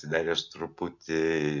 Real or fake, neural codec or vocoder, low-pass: real; none; 7.2 kHz